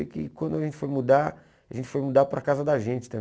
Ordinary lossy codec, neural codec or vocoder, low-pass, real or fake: none; none; none; real